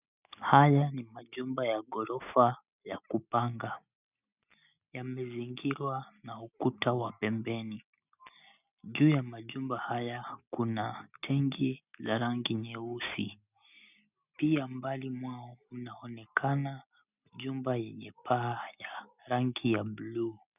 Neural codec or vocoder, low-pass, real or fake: none; 3.6 kHz; real